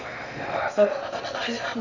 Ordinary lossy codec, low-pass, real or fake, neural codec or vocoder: none; 7.2 kHz; fake; codec, 16 kHz in and 24 kHz out, 0.6 kbps, FocalCodec, streaming, 2048 codes